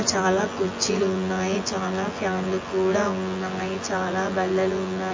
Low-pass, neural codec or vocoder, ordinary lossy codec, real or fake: 7.2 kHz; vocoder, 24 kHz, 100 mel bands, Vocos; MP3, 32 kbps; fake